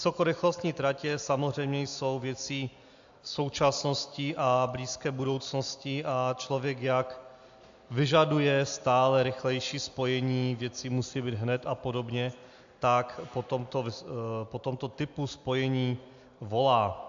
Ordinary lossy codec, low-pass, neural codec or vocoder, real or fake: AAC, 64 kbps; 7.2 kHz; none; real